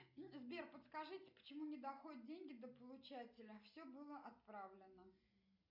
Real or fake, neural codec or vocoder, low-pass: real; none; 5.4 kHz